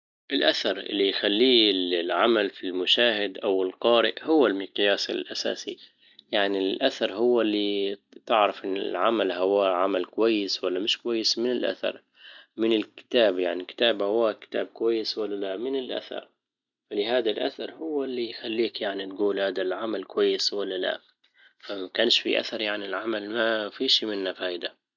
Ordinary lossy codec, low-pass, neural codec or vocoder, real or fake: none; 7.2 kHz; none; real